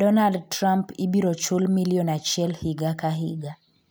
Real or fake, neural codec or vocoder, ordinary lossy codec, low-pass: real; none; none; none